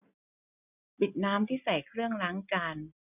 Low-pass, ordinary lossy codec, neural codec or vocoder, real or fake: 3.6 kHz; none; none; real